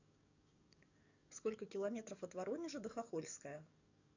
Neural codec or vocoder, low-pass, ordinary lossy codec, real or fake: codec, 44.1 kHz, 7.8 kbps, DAC; 7.2 kHz; none; fake